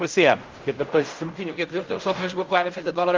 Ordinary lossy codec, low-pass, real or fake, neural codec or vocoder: Opus, 24 kbps; 7.2 kHz; fake; codec, 16 kHz in and 24 kHz out, 0.4 kbps, LongCat-Audio-Codec, fine tuned four codebook decoder